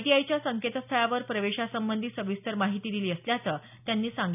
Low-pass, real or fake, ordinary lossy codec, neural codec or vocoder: 3.6 kHz; real; none; none